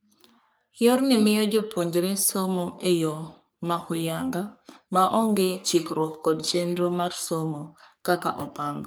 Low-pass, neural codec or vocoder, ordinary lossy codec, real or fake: none; codec, 44.1 kHz, 3.4 kbps, Pupu-Codec; none; fake